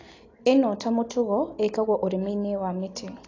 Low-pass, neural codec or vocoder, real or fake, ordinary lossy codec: 7.2 kHz; none; real; AAC, 32 kbps